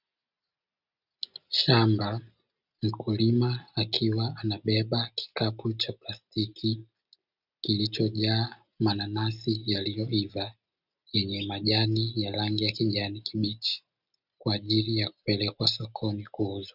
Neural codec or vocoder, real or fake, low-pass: none; real; 5.4 kHz